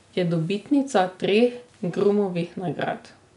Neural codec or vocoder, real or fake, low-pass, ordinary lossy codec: none; real; 10.8 kHz; MP3, 96 kbps